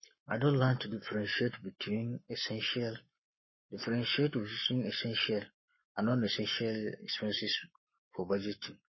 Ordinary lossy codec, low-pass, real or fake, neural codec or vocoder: MP3, 24 kbps; 7.2 kHz; fake; codec, 44.1 kHz, 7.8 kbps, Pupu-Codec